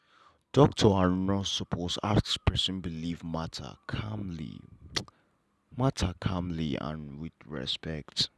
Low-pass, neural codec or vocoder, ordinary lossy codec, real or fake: none; none; none; real